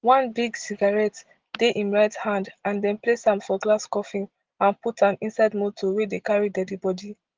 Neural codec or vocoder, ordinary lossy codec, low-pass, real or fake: none; Opus, 16 kbps; 7.2 kHz; real